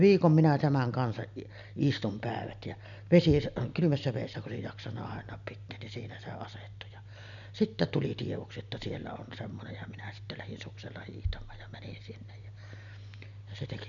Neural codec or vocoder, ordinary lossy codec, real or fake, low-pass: none; none; real; 7.2 kHz